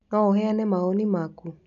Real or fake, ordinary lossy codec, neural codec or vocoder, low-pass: real; none; none; 7.2 kHz